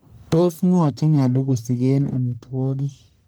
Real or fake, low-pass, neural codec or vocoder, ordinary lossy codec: fake; none; codec, 44.1 kHz, 1.7 kbps, Pupu-Codec; none